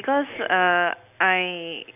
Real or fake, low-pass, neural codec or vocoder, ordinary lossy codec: real; 3.6 kHz; none; none